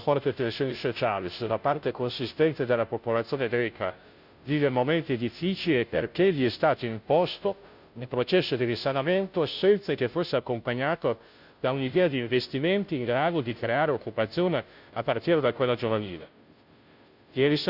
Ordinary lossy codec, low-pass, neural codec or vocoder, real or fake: none; 5.4 kHz; codec, 16 kHz, 0.5 kbps, FunCodec, trained on Chinese and English, 25 frames a second; fake